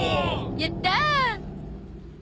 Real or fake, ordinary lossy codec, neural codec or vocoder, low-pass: real; none; none; none